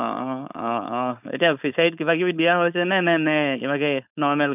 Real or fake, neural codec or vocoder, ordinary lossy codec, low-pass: fake; codec, 16 kHz, 4.8 kbps, FACodec; none; 3.6 kHz